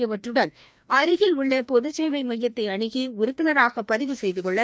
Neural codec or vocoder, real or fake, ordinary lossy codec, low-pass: codec, 16 kHz, 1 kbps, FreqCodec, larger model; fake; none; none